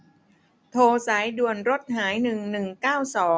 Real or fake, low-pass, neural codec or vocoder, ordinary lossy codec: real; none; none; none